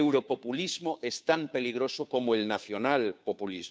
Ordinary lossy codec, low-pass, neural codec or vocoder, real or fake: none; none; codec, 16 kHz, 2 kbps, FunCodec, trained on Chinese and English, 25 frames a second; fake